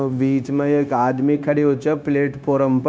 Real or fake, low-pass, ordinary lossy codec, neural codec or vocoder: fake; none; none; codec, 16 kHz, 0.9 kbps, LongCat-Audio-Codec